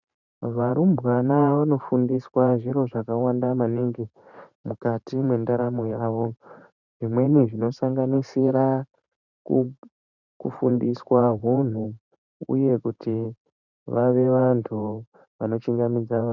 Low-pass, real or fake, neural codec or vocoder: 7.2 kHz; fake; vocoder, 44.1 kHz, 128 mel bands every 512 samples, BigVGAN v2